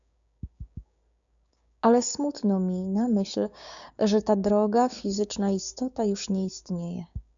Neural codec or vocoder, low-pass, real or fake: codec, 16 kHz, 6 kbps, DAC; 7.2 kHz; fake